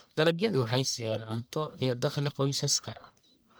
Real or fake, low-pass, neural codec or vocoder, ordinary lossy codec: fake; none; codec, 44.1 kHz, 1.7 kbps, Pupu-Codec; none